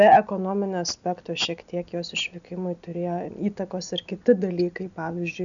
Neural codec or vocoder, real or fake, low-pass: none; real; 7.2 kHz